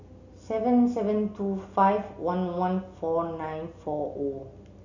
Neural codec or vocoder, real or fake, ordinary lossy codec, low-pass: none; real; none; 7.2 kHz